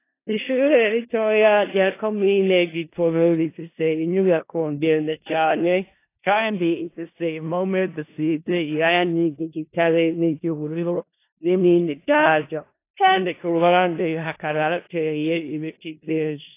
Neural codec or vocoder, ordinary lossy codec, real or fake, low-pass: codec, 16 kHz in and 24 kHz out, 0.4 kbps, LongCat-Audio-Codec, four codebook decoder; AAC, 24 kbps; fake; 3.6 kHz